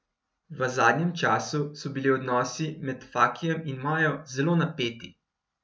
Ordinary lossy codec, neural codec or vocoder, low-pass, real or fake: none; none; none; real